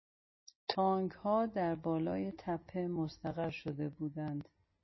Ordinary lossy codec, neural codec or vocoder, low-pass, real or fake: MP3, 24 kbps; none; 7.2 kHz; real